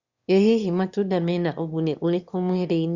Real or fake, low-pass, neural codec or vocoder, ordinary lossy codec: fake; 7.2 kHz; autoencoder, 22.05 kHz, a latent of 192 numbers a frame, VITS, trained on one speaker; Opus, 64 kbps